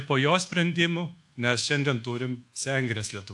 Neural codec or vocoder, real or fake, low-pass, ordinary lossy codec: codec, 24 kHz, 1.2 kbps, DualCodec; fake; 10.8 kHz; AAC, 64 kbps